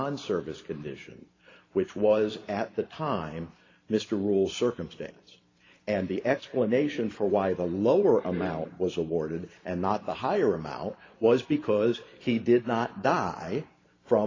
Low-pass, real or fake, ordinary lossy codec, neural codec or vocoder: 7.2 kHz; fake; AAC, 32 kbps; vocoder, 44.1 kHz, 128 mel bands every 512 samples, BigVGAN v2